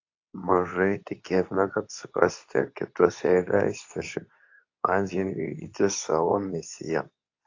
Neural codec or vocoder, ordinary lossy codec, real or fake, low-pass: codec, 24 kHz, 0.9 kbps, WavTokenizer, medium speech release version 2; AAC, 48 kbps; fake; 7.2 kHz